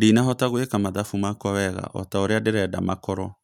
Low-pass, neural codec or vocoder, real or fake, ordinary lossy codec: 19.8 kHz; none; real; none